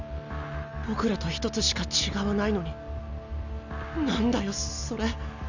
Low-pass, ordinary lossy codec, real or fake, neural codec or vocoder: 7.2 kHz; none; real; none